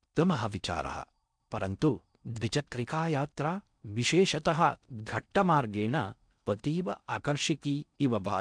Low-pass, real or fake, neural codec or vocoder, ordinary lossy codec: 9.9 kHz; fake; codec, 16 kHz in and 24 kHz out, 0.6 kbps, FocalCodec, streaming, 2048 codes; none